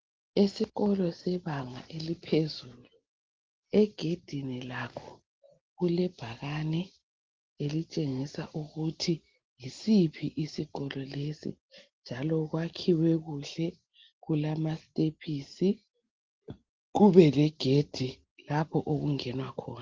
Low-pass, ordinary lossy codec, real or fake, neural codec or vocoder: 7.2 kHz; Opus, 16 kbps; real; none